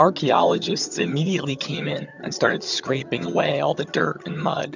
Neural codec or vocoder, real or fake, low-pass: vocoder, 22.05 kHz, 80 mel bands, HiFi-GAN; fake; 7.2 kHz